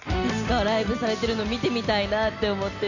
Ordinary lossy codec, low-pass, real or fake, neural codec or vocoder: none; 7.2 kHz; real; none